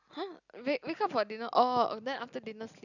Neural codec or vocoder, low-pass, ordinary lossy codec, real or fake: none; 7.2 kHz; none; real